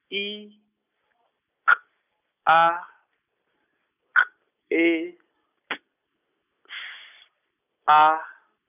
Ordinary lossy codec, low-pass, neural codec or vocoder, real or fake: none; 3.6 kHz; none; real